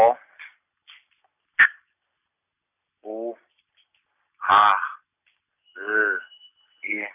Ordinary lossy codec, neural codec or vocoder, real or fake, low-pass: none; none; real; 3.6 kHz